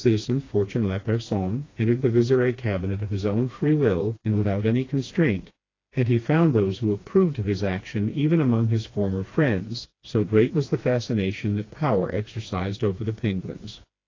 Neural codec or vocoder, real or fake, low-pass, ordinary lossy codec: codec, 16 kHz, 2 kbps, FreqCodec, smaller model; fake; 7.2 kHz; AAC, 32 kbps